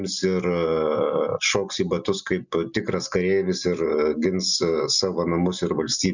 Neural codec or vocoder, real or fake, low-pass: none; real; 7.2 kHz